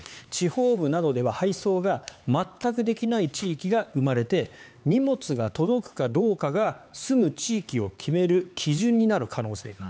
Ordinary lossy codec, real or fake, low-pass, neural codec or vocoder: none; fake; none; codec, 16 kHz, 4 kbps, X-Codec, HuBERT features, trained on LibriSpeech